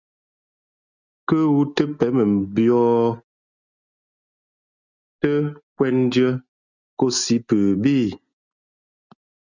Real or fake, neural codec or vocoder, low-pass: real; none; 7.2 kHz